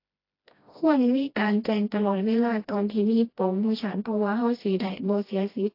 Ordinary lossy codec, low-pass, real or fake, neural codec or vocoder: AAC, 32 kbps; 5.4 kHz; fake; codec, 16 kHz, 1 kbps, FreqCodec, smaller model